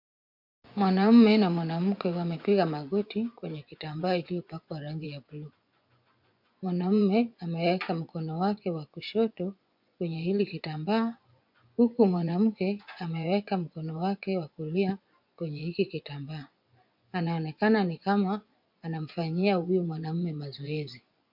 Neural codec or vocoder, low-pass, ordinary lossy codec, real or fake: vocoder, 24 kHz, 100 mel bands, Vocos; 5.4 kHz; MP3, 48 kbps; fake